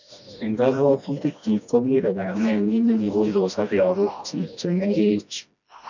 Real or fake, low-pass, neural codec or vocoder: fake; 7.2 kHz; codec, 16 kHz, 1 kbps, FreqCodec, smaller model